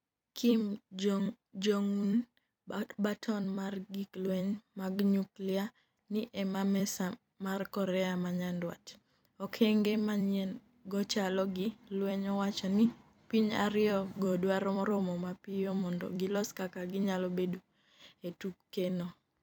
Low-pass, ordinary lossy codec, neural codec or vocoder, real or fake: 19.8 kHz; none; vocoder, 44.1 kHz, 128 mel bands every 256 samples, BigVGAN v2; fake